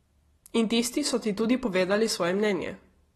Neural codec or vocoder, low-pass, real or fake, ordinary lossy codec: none; 19.8 kHz; real; AAC, 32 kbps